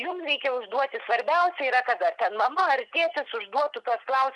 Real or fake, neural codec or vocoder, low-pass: real; none; 10.8 kHz